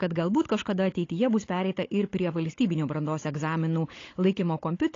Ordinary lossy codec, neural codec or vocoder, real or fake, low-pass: AAC, 32 kbps; codec, 16 kHz, 16 kbps, FunCodec, trained on Chinese and English, 50 frames a second; fake; 7.2 kHz